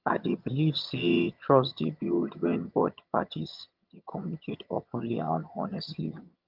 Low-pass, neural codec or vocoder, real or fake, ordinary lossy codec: 5.4 kHz; vocoder, 22.05 kHz, 80 mel bands, HiFi-GAN; fake; Opus, 24 kbps